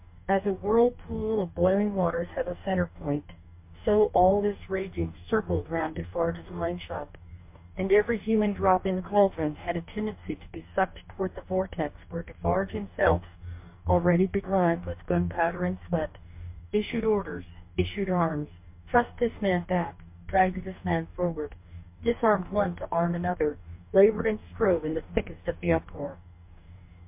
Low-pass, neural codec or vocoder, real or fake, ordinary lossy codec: 3.6 kHz; codec, 44.1 kHz, 2.6 kbps, DAC; fake; MP3, 32 kbps